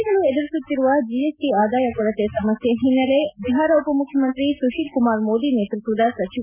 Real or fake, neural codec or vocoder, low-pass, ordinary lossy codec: real; none; 3.6 kHz; none